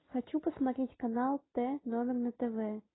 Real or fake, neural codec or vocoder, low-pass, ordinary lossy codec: real; none; 7.2 kHz; AAC, 16 kbps